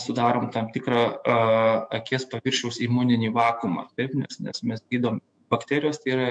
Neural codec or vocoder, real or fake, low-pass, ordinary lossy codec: autoencoder, 48 kHz, 128 numbers a frame, DAC-VAE, trained on Japanese speech; fake; 9.9 kHz; MP3, 64 kbps